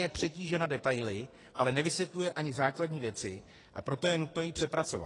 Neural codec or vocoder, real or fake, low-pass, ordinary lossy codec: codec, 44.1 kHz, 2.6 kbps, SNAC; fake; 10.8 kHz; AAC, 32 kbps